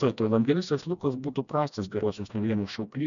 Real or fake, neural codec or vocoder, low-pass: fake; codec, 16 kHz, 1 kbps, FreqCodec, smaller model; 7.2 kHz